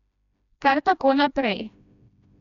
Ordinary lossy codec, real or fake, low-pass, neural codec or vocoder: none; fake; 7.2 kHz; codec, 16 kHz, 1 kbps, FreqCodec, smaller model